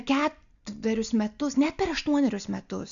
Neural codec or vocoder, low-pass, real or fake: none; 7.2 kHz; real